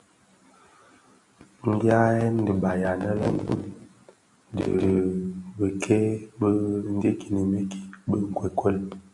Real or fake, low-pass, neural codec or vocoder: real; 10.8 kHz; none